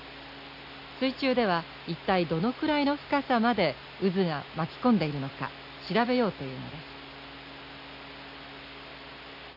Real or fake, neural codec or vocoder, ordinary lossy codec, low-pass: real; none; AAC, 48 kbps; 5.4 kHz